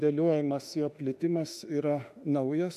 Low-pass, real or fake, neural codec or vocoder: 14.4 kHz; fake; autoencoder, 48 kHz, 32 numbers a frame, DAC-VAE, trained on Japanese speech